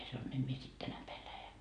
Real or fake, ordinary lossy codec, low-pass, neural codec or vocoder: real; none; 9.9 kHz; none